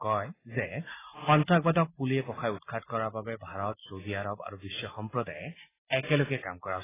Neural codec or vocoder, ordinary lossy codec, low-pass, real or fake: none; AAC, 16 kbps; 3.6 kHz; real